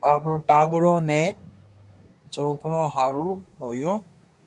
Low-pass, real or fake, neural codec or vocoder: 10.8 kHz; fake; codec, 24 kHz, 1 kbps, SNAC